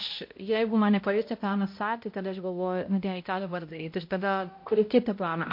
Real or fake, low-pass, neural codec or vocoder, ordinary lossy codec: fake; 5.4 kHz; codec, 16 kHz, 0.5 kbps, X-Codec, HuBERT features, trained on balanced general audio; MP3, 32 kbps